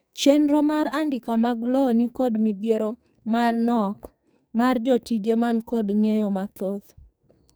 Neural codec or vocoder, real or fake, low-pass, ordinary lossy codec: codec, 44.1 kHz, 2.6 kbps, SNAC; fake; none; none